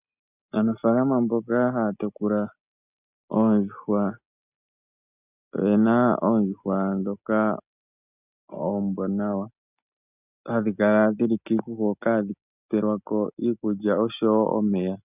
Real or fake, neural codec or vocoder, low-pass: real; none; 3.6 kHz